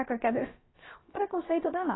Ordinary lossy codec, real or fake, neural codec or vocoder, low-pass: AAC, 16 kbps; fake; codec, 16 kHz, about 1 kbps, DyCAST, with the encoder's durations; 7.2 kHz